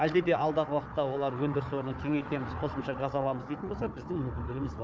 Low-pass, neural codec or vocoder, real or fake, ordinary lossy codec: none; codec, 16 kHz, 4 kbps, FunCodec, trained on Chinese and English, 50 frames a second; fake; none